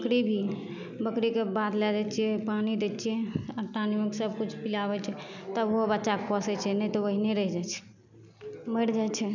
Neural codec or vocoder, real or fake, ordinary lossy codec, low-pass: none; real; none; 7.2 kHz